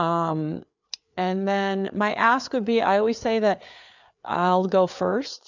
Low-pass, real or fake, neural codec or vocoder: 7.2 kHz; fake; codec, 16 kHz, 4 kbps, FreqCodec, larger model